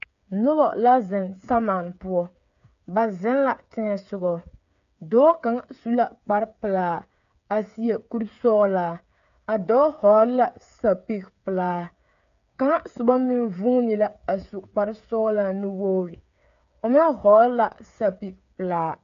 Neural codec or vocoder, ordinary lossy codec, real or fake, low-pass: codec, 16 kHz, 8 kbps, FreqCodec, smaller model; MP3, 96 kbps; fake; 7.2 kHz